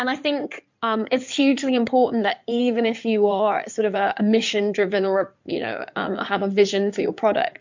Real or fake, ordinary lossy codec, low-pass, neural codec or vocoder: fake; MP3, 64 kbps; 7.2 kHz; codec, 16 kHz in and 24 kHz out, 2.2 kbps, FireRedTTS-2 codec